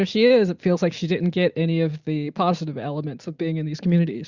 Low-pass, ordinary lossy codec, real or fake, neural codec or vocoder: 7.2 kHz; Opus, 64 kbps; real; none